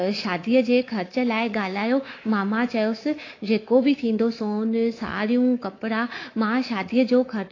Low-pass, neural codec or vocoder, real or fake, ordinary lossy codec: 7.2 kHz; codec, 24 kHz, 3.1 kbps, DualCodec; fake; AAC, 32 kbps